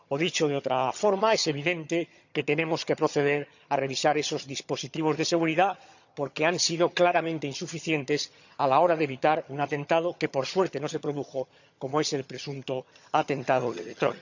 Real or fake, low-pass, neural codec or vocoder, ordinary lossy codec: fake; 7.2 kHz; vocoder, 22.05 kHz, 80 mel bands, HiFi-GAN; none